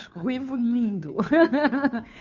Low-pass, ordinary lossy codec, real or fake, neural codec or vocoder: 7.2 kHz; none; fake; codec, 16 kHz, 2 kbps, FunCodec, trained on Chinese and English, 25 frames a second